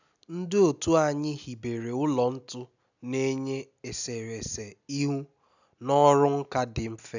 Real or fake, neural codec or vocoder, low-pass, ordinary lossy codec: real; none; 7.2 kHz; none